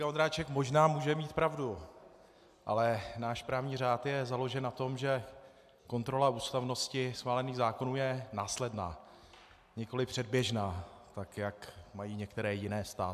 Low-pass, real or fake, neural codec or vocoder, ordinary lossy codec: 14.4 kHz; real; none; AAC, 96 kbps